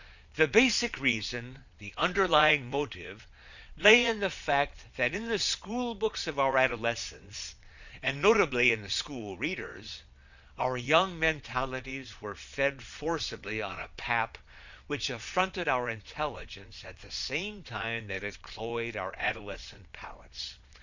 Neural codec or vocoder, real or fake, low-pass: vocoder, 22.05 kHz, 80 mel bands, Vocos; fake; 7.2 kHz